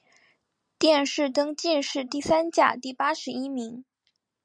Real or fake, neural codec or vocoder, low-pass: real; none; 9.9 kHz